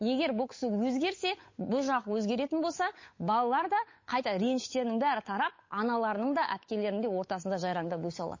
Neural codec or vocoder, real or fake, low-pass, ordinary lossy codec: codec, 16 kHz, 8 kbps, FunCodec, trained on Chinese and English, 25 frames a second; fake; 7.2 kHz; MP3, 32 kbps